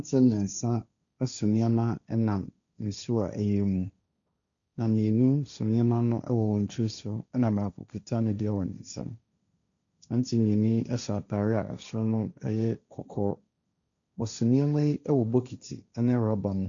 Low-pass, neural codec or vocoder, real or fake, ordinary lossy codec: 7.2 kHz; codec, 16 kHz, 1.1 kbps, Voila-Tokenizer; fake; MP3, 96 kbps